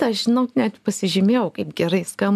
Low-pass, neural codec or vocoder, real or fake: 14.4 kHz; none; real